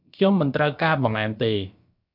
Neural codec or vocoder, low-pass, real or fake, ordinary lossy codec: codec, 16 kHz, about 1 kbps, DyCAST, with the encoder's durations; 5.4 kHz; fake; AAC, 48 kbps